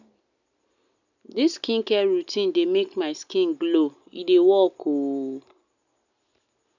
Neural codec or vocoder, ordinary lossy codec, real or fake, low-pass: none; none; real; 7.2 kHz